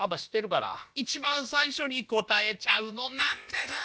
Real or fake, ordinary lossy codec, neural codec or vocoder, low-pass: fake; none; codec, 16 kHz, about 1 kbps, DyCAST, with the encoder's durations; none